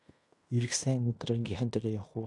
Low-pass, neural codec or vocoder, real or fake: 10.8 kHz; codec, 16 kHz in and 24 kHz out, 0.9 kbps, LongCat-Audio-Codec, fine tuned four codebook decoder; fake